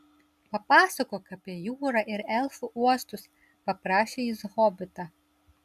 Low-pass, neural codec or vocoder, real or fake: 14.4 kHz; none; real